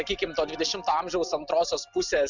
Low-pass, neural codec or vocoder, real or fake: 7.2 kHz; none; real